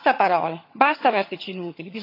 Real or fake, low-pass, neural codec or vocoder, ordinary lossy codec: fake; 5.4 kHz; vocoder, 22.05 kHz, 80 mel bands, HiFi-GAN; AAC, 32 kbps